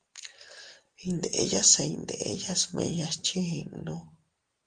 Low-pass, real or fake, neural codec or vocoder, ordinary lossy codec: 9.9 kHz; real; none; Opus, 24 kbps